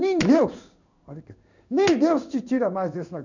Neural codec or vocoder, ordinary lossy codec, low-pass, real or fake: codec, 16 kHz in and 24 kHz out, 1 kbps, XY-Tokenizer; none; 7.2 kHz; fake